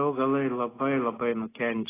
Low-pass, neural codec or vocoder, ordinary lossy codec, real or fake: 3.6 kHz; none; AAC, 16 kbps; real